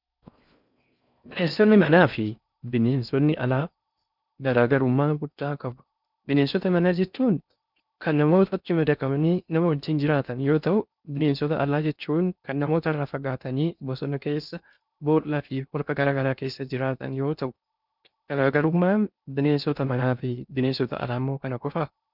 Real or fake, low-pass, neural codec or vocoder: fake; 5.4 kHz; codec, 16 kHz in and 24 kHz out, 0.6 kbps, FocalCodec, streaming, 4096 codes